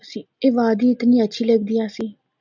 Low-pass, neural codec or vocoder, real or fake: 7.2 kHz; none; real